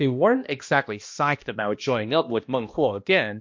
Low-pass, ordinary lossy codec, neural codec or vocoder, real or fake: 7.2 kHz; MP3, 48 kbps; codec, 16 kHz, 1 kbps, X-Codec, HuBERT features, trained on balanced general audio; fake